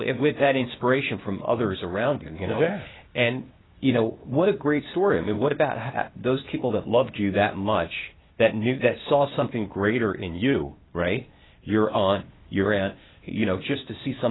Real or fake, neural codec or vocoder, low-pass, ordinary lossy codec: fake; codec, 16 kHz, 0.8 kbps, ZipCodec; 7.2 kHz; AAC, 16 kbps